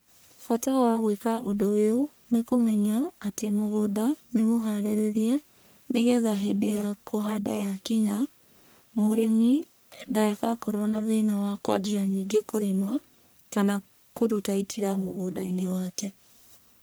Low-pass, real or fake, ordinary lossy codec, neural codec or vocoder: none; fake; none; codec, 44.1 kHz, 1.7 kbps, Pupu-Codec